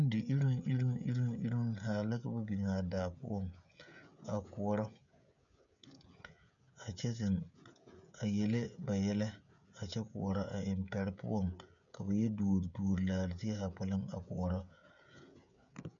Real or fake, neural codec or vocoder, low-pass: fake; codec, 16 kHz, 16 kbps, FreqCodec, smaller model; 7.2 kHz